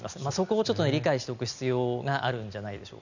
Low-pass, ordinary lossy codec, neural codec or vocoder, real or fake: 7.2 kHz; none; none; real